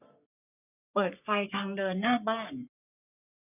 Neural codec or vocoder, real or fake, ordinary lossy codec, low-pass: codec, 44.1 kHz, 3.4 kbps, Pupu-Codec; fake; none; 3.6 kHz